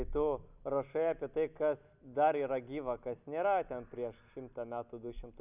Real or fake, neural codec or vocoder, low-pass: real; none; 3.6 kHz